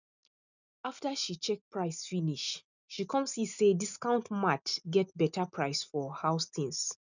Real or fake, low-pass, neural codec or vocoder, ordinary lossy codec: real; 7.2 kHz; none; none